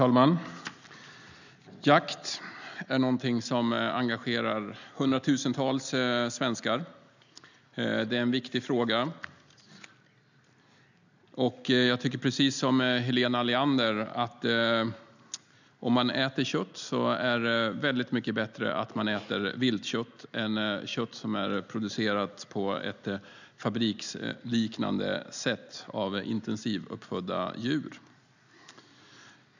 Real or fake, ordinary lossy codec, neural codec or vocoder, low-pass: real; none; none; 7.2 kHz